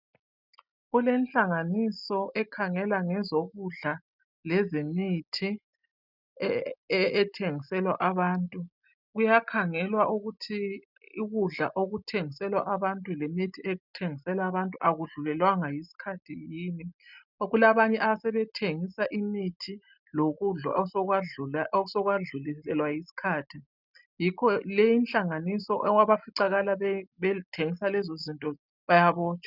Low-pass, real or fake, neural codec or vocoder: 5.4 kHz; real; none